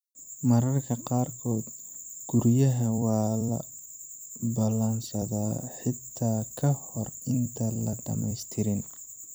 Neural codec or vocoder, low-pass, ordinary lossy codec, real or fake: vocoder, 44.1 kHz, 128 mel bands every 256 samples, BigVGAN v2; none; none; fake